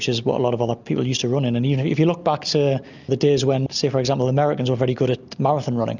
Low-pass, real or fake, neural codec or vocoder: 7.2 kHz; real; none